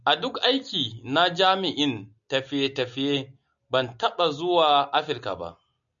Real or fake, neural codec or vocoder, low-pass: real; none; 7.2 kHz